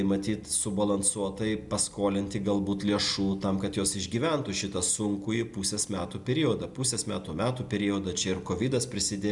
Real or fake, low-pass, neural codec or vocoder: real; 10.8 kHz; none